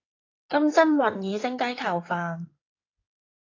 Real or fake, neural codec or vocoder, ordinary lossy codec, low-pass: fake; codec, 16 kHz in and 24 kHz out, 2.2 kbps, FireRedTTS-2 codec; AAC, 32 kbps; 7.2 kHz